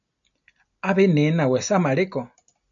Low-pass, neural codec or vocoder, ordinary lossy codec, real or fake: 7.2 kHz; none; MP3, 96 kbps; real